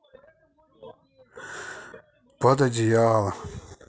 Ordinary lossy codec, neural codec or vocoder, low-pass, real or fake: none; none; none; real